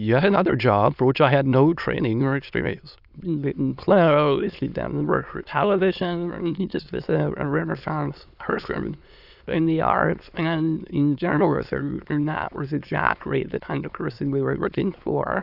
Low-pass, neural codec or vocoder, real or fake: 5.4 kHz; autoencoder, 22.05 kHz, a latent of 192 numbers a frame, VITS, trained on many speakers; fake